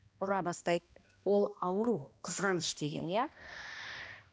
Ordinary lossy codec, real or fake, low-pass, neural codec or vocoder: none; fake; none; codec, 16 kHz, 1 kbps, X-Codec, HuBERT features, trained on balanced general audio